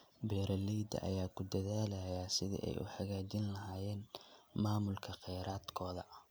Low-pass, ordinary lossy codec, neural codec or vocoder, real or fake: none; none; none; real